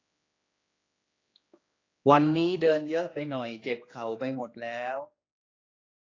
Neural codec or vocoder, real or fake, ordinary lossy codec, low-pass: codec, 16 kHz, 2 kbps, X-Codec, HuBERT features, trained on general audio; fake; AAC, 32 kbps; 7.2 kHz